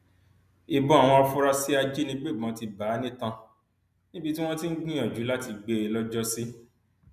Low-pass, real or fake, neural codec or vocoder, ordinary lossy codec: 14.4 kHz; real; none; none